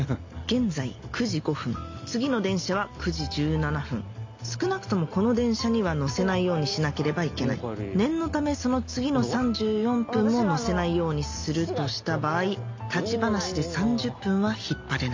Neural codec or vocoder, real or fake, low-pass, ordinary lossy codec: none; real; 7.2 kHz; MP3, 48 kbps